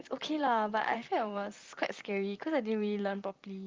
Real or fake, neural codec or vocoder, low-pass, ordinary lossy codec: fake; vocoder, 44.1 kHz, 128 mel bands, Pupu-Vocoder; 7.2 kHz; Opus, 24 kbps